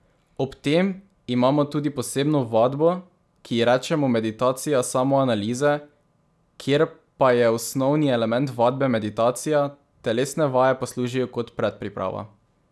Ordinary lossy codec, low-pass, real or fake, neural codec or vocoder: none; none; real; none